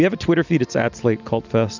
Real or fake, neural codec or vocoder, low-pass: real; none; 7.2 kHz